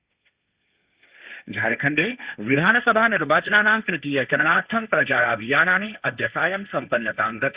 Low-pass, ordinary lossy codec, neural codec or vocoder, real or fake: 3.6 kHz; Opus, 64 kbps; codec, 16 kHz, 1.1 kbps, Voila-Tokenizer; fake